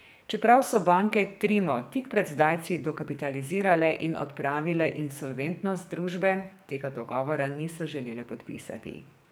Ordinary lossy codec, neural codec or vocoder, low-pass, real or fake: none; codec, 44.1 kHz, 2.6 kbps, SNAC; none; fake